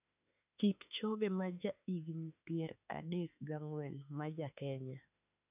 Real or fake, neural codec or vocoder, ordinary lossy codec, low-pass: fake; autoencoder, 48 kHz, 32 numbers a frame, DAC-VAE, trained on Japanese speech; none; 3.6 kHz